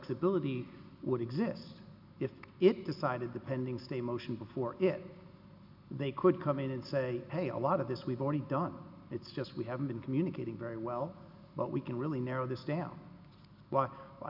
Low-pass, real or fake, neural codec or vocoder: 5.4 kHz; real; none